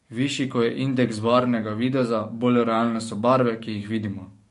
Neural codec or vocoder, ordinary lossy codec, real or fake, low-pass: codec, 44.1 kHz, 7.8 kbps, DAC; MP3, 48 kbps; fake; 14.4 kHz